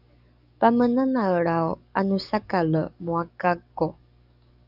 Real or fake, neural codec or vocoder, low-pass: fake; codec, 44.1 kHz, 7.8 kbps, DAC; 5.4 kHz